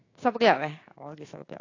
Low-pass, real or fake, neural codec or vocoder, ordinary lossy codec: 7.2 kHz; fake; codec, 16 kHz, 6 kbps, DAC; AAC, 32 kbps